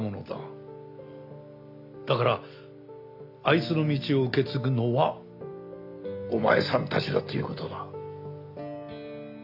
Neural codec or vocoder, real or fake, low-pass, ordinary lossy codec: none; real; 5.4 kHz; none